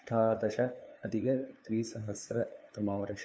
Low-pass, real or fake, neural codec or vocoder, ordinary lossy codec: none; fake; codec, 16 kHz, 2 kbps, FunCodec, trained on LibriTTS, 25 frames a second; none